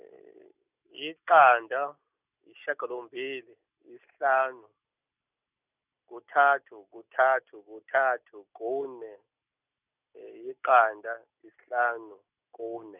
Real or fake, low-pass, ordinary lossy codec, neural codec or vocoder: real; 3.6 kHz; none; none